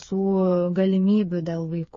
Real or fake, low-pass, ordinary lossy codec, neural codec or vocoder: fake; 7.2 kHz; MP3, 32 kbps; codec, 16 kHz, 4 kbps, FreqCodec, smaller model